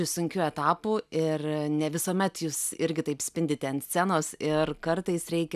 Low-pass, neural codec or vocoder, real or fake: 14.4 kHz; none; real